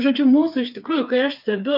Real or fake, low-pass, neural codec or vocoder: fake; 5.4 kHz; codec, 16 kHz, 4 kbps, FreqCodec, smaller model